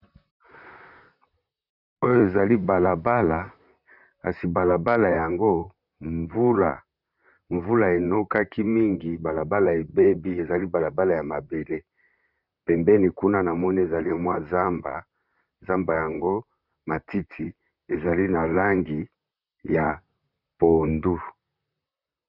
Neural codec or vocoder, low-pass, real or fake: vocoder, 44.1 kHz, 128 mel bands, Pupu-Vocoder; 5.4 kHz; fake